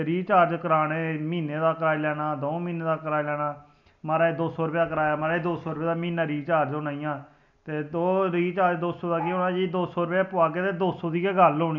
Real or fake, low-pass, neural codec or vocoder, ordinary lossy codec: real; 7.2 kHz; none; none